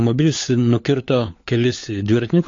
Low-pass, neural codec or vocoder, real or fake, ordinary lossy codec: 7.2 kHz; codec, 16 kHz, 4 kbps, FunCodec, trained on LibriTTS, 50 frames a second; fake; AAC, 48 kbps